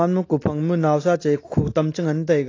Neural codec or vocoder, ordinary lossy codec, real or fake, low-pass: none; AAC, 32 kbps; real; 7.2 kHz